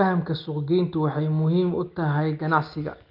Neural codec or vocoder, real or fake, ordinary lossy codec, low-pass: none; real; Opus, 24 kbps; 5.4 kHz